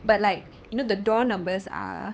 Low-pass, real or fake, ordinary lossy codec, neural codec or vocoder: none; fake; none; codec, 16 kHz, 4 kbps, X-Codec, HuBERT features, trained on LibriSpeech